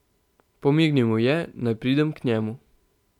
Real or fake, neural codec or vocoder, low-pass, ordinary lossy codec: real; none; 19.8 kHz; none